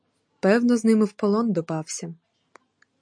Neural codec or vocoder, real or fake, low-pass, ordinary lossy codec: none; real; 9.9 kHz; MP3, 48 kbps